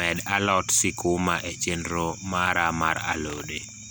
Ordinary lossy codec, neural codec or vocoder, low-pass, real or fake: none; none; none; real